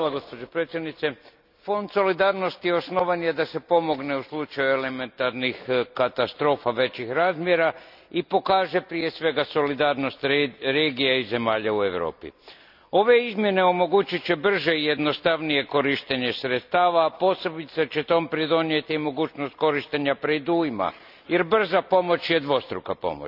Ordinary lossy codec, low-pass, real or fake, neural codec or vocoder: none; 5.4 kHz; real; none